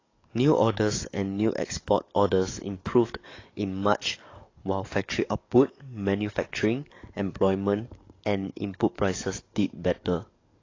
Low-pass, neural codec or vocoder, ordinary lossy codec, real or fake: 7.2 kHz; codec, 44.1 kHz, 7.8 kbps, DAC; AAC, 32 kbps; fake